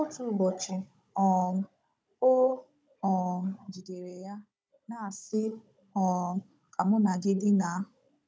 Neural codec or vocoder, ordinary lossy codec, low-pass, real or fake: codec, 16 kHz, 16 kbps, FunCodec, trained on Chinese and English, 50 frames a second; none; none; fake